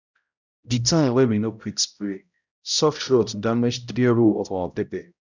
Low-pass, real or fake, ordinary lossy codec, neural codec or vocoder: 7.2 kHz; fake; none; codec, 16 kHz, 0.5 kbps, X-Codec, HuBERT features, trained on balanced general audio